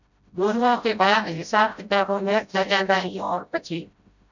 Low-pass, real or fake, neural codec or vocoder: 7.2 kHz; fake; codec, 16 kHz, 0.5 kbps, FreqCodec, smaller model